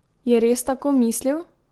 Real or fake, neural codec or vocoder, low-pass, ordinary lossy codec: real; none; 19.8 kHz; Opus, 16 kbps